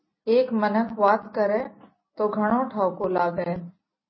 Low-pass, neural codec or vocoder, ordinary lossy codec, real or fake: 7.2 kHz; none; MP3, 24 kbps; real